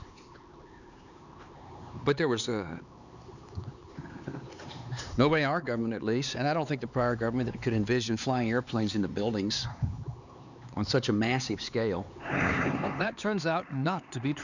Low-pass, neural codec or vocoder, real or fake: 7.2 kHz; codec, 16 kHz, 4 kbps, X-Codec, HuBERT features, trained on LibriSpeech; fake